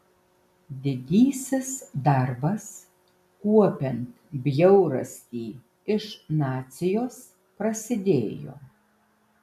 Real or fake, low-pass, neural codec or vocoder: real; 14.4 kHz; none